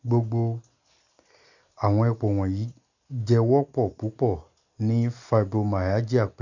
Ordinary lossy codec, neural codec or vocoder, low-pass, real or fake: none; none; 7.2 kHz; real